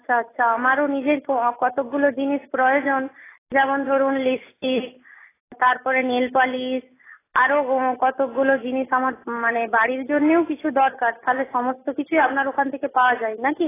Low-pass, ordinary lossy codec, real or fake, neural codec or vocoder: 3.6 kHz; AAC, 16 kbps; real; none